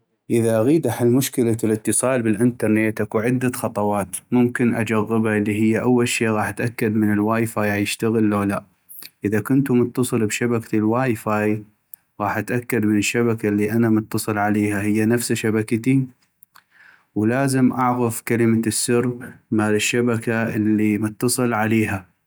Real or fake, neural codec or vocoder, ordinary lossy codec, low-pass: real; none; none; none